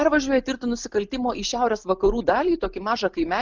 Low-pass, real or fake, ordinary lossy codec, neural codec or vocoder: 7.2 kHz; real; Opus, 32 kbps; none